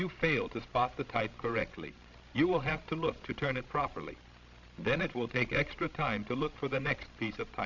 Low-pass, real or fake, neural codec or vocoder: 7.2 kHz; fake; codec, 16 kHz, 16 kbps, FreqCodec, larger model